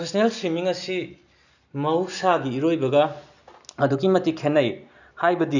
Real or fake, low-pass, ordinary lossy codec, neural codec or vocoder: real; 7.2 kHz; none; none